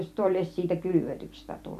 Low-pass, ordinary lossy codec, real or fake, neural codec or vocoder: 14.4 kHz; MP3, 96 kbps; fake; vocoder, 44.1 kHz, 128 mel bands every 256 samples, BigVGAN v2